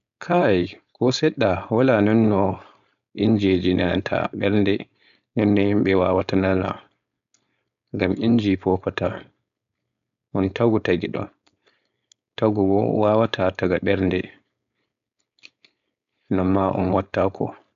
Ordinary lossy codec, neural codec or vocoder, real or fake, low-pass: none; codec, 16 kHz, 4.8 kbps, FACodec; fake; 7.2 kHz